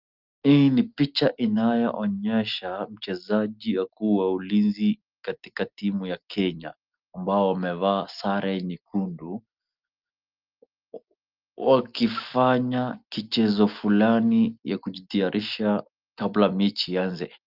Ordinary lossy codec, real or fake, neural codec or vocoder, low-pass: Opus, 32 kbps; real; none; 5.4 kHz